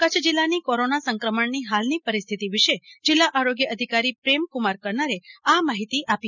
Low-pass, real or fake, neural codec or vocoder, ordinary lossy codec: 7.2 kHz; real; none; none